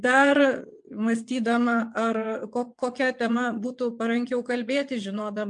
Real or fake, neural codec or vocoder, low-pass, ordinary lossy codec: fake; vocoder, 22.05 kHz, 80 mel bands, WaveNeXt; 9.9 kHz; MP3, 64 kbps